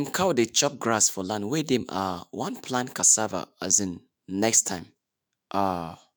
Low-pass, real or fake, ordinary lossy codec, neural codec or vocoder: none; fake; none; autoencoder, 48 kHz, 128 numbers a frame, DAC-VAE, trained on Japanese speech